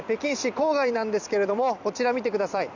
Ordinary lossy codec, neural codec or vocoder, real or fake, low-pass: none; vocoder, 44.1 kHz, 128 mel bands every 256 samples, BigVGAN v2; fake; 7.2 kHz